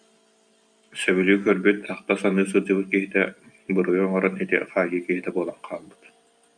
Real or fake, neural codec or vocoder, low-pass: real; none; 9.9 kHz